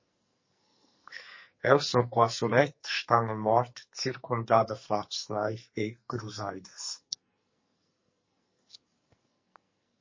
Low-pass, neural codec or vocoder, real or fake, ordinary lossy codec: 7.2 kHz; codec, 44.1 kHz, 2.6 kbps, SNAC; fake; MP3, 32 kbps